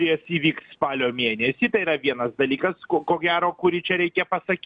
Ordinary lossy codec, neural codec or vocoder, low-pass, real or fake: MP3, 96 kbps; none; 9.9 kHz; real